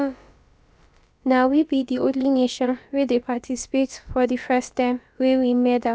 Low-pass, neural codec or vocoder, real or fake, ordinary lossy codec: none; codec, 16 kHz, about 1 kbps, DyCAST, with the encoder's durations; fake; none